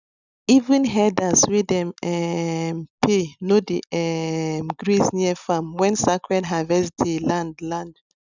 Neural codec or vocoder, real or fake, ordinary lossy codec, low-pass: none; real; none; 7.2 kHz